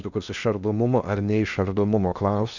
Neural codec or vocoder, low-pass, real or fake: codec, 16 kHz in and 24 kHz out, 0.6 kbps, FocalCodec, streaming, 4096 codes; 7.2 kHz; fake